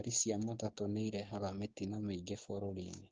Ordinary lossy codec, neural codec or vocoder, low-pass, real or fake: Opus, 16 kbps; codec, 16 kHz, 6 kbps, DAC; 7.2 kHz; fake